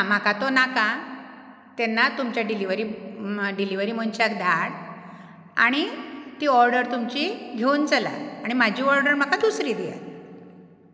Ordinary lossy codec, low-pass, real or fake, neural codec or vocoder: none; none; real; none